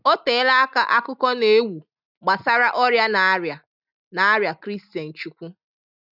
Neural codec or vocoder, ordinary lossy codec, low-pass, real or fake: none; none; 5.4 kHz; real